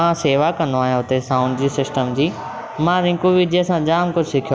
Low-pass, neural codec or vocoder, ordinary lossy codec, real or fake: none; none; none; real